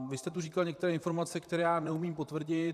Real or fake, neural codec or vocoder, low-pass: fake; vocoder, 44.1 kHz, 128 mel bands, Pupu-Vocoder; 14.4 kHz